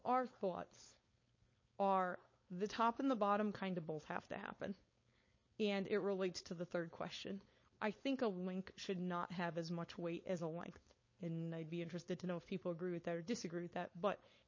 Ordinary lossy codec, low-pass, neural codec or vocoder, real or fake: MP3, 32 kbps; 7.2 kHz; codec, 16 kHz, 4.8 kbps, FACodec; fake